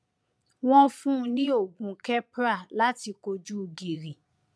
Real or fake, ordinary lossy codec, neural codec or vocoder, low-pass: fake; none; vocoder, 22.05 kHz, 80 mel bands, Vocos; none